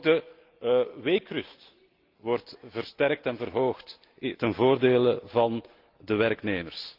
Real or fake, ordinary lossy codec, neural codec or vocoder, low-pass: real; Opus, 32 kbps; none; 5.4 kHz